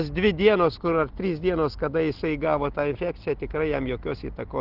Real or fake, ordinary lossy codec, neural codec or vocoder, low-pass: real; Opus, 16 kbps; none; 5.4 kHz